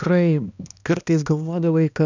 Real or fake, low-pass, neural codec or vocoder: fake; 7.2 kHz; codec, 16 kHz, 1 kbps, X-Codec, HuBERT features, trained on balanced general audio